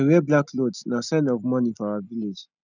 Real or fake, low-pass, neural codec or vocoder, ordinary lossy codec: real; 7.2 kHz; none; none